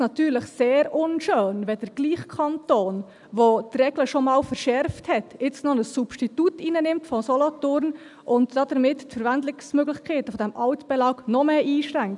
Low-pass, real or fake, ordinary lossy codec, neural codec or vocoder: 10.8 kHz; real; none; none